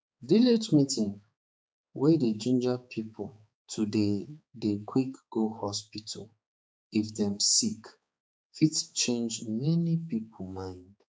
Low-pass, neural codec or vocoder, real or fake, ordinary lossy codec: none; codec, 16 kHz, 4 kbps, X-Codec, HuBERT features, trained on balanced general audio; fake; none